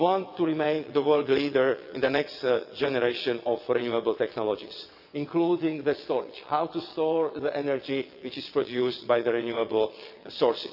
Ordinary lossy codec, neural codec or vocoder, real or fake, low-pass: none; vocoder, 22.05 kHz, 80 mel bands, WaveNeXt; fake; 5.4 kHz